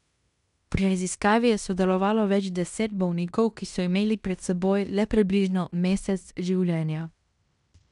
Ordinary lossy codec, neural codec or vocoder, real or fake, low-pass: none; codec, 16 kHz in and 24 kHz out, 0.9 kbps, LongCat-Audio-Codec, fine tuned four codebook decoder; fake; 10.8 kHz